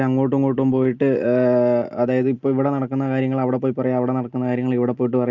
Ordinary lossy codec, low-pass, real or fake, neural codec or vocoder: Opus, 32 kbps; 7.2 kHz; real; none